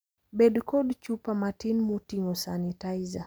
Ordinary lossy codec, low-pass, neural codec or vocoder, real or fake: none; none; none; real